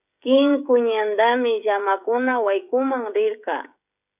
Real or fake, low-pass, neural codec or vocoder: fake; 3.6 kHz; codec, 16 kHz, 16 kbps, FreqCodec, smaller model